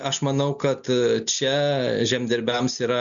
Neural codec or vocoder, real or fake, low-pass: none; real; 7.2 kHz